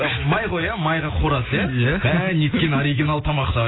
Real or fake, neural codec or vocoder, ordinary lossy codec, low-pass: real; none; AAC, 16 kbps; 7.2 kHz